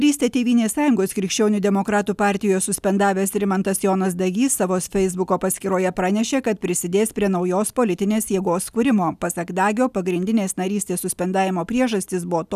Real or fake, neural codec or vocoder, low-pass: real; none; 14.4 kHz